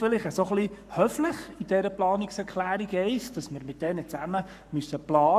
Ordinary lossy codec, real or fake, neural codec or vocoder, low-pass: AAC, 96 kbps; fake; codec, 44.1 kHz, 7.8 kbps, Pupu-Codec; 14.4 kHz